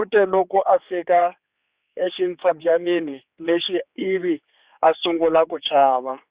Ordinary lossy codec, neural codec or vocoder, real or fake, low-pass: Opus, 64 kbps; codec, 16 kHz, 4 kbps, X-Codec, HuBERT features, trained on general audio; fake; 3.6 kHz